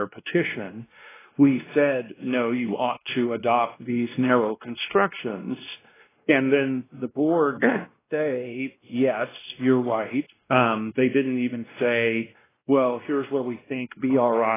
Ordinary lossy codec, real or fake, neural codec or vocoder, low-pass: AAC, 16 kbps; fake; codec, 16 kHz, 1 kbps, X-Codec, WavLM features, trained on Multilingual LibriSpeech; 3.6 kHz